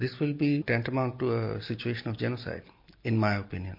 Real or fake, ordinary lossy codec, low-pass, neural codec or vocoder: real; MP3, 32 kbps; 5.4 kHz; none